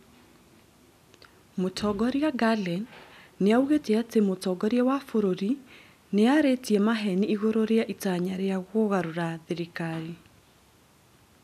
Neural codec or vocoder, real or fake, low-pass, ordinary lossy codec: none; real; 14.4 kHz; none